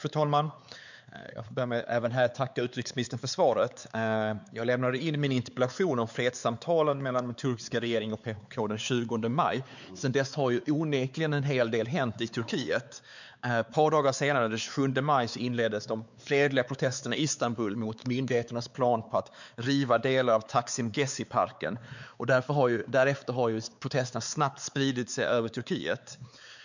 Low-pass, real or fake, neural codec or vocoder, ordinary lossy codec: 7.2 kHz; fake; codec, 16 kHz, 4 kbps, X-Codec, WavLM features, trained on Multilingual LibriSpeech; none